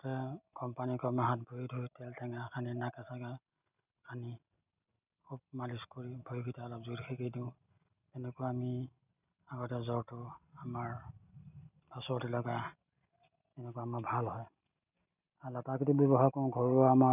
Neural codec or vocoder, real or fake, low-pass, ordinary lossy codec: none; real; 3.6 kHz; none